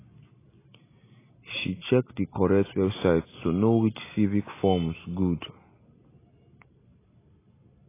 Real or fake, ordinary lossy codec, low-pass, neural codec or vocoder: real; AAC, 16 kbps; 3.6 kHz; none